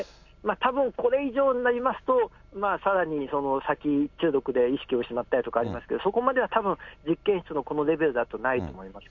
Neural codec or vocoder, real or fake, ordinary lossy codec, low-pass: none; real; none; 7.2 kHz